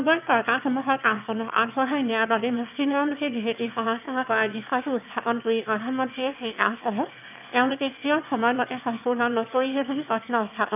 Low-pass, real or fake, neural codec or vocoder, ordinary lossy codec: 3.6 kHz; fake; autoencoder, 22.05 kHz, a latent of 192 numbers a frame, VITS, trained on one speaker; none